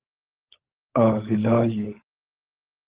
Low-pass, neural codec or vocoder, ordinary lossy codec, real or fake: 3.6 kHz; codec, 16 kHz, 16 kbps, FunCodec, trained on LibriTTS, 50 frames a second; Opus, 16 kbps; fake